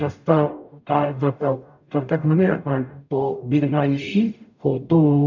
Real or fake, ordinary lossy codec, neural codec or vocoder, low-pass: fake; none; codec, 44.1 kHz, 0.9 kbps, DAC; 7.2 kHz